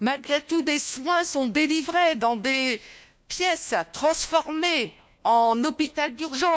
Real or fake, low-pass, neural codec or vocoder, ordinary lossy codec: fake; none; codec, 16 kHz, 1 kbps, FunCodec, trained on LibriTTS, 50 frames a second; none